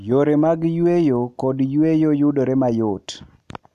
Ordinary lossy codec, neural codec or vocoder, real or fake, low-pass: none; none; real; 14.4 kHz